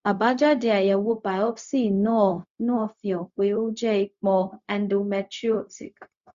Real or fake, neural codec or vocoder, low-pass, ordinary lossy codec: fake; codec, 16 kHz, 0.4 kbps, LongCat-Audio-Codec; 7.2 kHz; Opus, 64 kbps